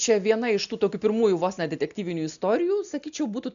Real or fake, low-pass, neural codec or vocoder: real; 7.2 kHz; none